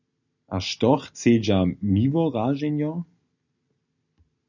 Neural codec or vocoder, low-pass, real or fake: none; 7.2 kHz; real